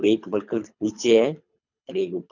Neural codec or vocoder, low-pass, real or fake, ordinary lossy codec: codec, 24 kHz, 3 kbps, HILCodec; 7.2 kHz; fake; none